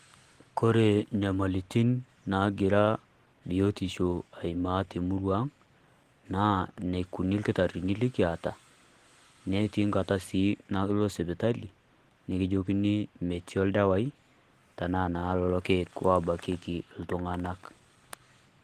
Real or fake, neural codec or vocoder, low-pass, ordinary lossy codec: real; none; 14.4 kHz; Opus, 16 kbps